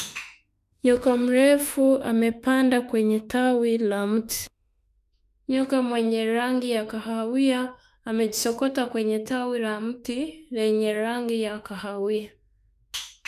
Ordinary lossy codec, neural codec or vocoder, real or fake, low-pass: none; autoencoder, 48 kHz, 32 numbers a frame, DAC-VAE, trained on Japanese speech; fake; 14.4 kHz